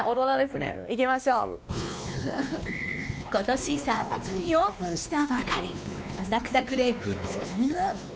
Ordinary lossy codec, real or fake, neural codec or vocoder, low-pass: none; fake; codec, 16 kHz, 2 kbps, X-Codec, WavLM features, trained on Multilingual LibriSpeech; none